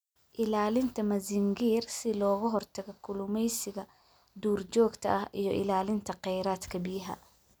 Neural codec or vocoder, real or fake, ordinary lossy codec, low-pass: none; real; none; none